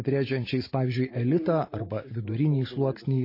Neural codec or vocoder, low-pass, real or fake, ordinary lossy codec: none; 5.4 kHz; real; MP3, 24 kbps